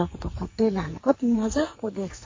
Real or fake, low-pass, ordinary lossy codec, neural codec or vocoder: fake; 7.2 kHz; MP3, 32 kbps; codec, 44.1 kHz, 2.6 kbps, SNAC